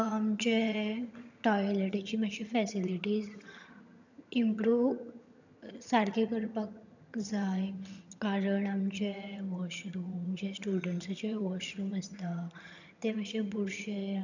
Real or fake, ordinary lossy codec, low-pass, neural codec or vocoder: fake; none; 7.2 kHz; vocoder, 22.05 kHz, 80 mel bands, HiFi-GAN